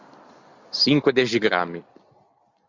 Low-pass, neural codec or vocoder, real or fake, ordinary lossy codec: 7.2 kHz; none; real; Opus, 64 kbps